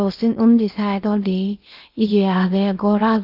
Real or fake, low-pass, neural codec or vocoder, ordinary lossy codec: fake; 5.4 kHz; codec, 16 kHz in and 24 kHz out, 0.8 kbps, FocalCodec, streaming, 65536 codes; Opus, 32 kbps